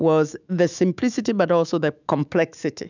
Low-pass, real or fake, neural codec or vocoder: 7.2 kHz; fake; autoencoder, 48 kHz, 128 numbers a frame, DAC-VAE, trained on Japanese speech